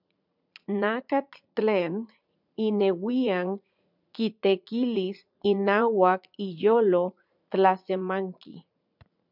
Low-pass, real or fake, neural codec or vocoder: 5.4 kHz; fake; vocoder, 44.1 kHz, 80 mel bands, Vocos